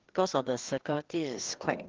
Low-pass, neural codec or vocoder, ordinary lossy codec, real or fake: 7.2 kHz; codec, 16 kHz, 2 kbps, FreqCodec, larger model; Opus, 16 kbps; fake